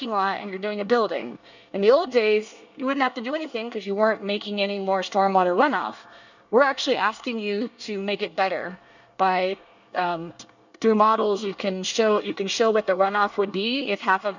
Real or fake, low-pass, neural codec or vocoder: fake; 7.2 kHz; codec, 24 kHz, 1 kbps, SNAC